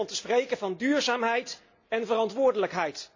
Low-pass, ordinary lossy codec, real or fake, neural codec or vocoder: 7.2 kHz; AAC, 48 kbps; real; none